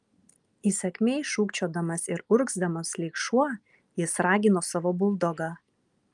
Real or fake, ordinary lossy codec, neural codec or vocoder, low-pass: real; Opus, 32 kbps; none; 10.8 kHz